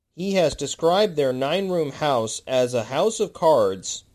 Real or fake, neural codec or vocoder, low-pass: real; none; 14.4 kHz